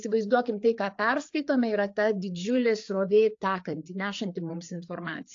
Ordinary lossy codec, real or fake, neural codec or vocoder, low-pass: AAC, 48 kbps; fake; codec, 16 kHz, 4 kbps, FreqCodec, larger model; 7.2 kHz